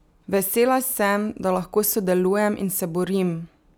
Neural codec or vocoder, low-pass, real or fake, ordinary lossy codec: none; none; real; none